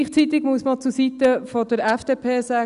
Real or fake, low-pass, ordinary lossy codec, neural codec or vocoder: real; 10.8 kHz; none; none